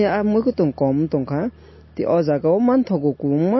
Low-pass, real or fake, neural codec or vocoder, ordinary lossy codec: 7.2 kHz; real; none; MP3, 24 kbps